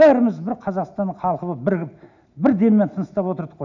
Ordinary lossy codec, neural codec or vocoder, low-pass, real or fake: none; none; 7.2 kHz; real